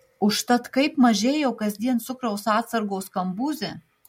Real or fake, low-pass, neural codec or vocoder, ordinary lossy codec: real; 19.8 kHz; none; MP3, 64 kbps